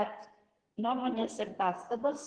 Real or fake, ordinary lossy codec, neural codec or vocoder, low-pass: fake; Opus, 16 kbps; codec, 44.1 kHz, 2.6 kbps, SNAC; 9.9 kHz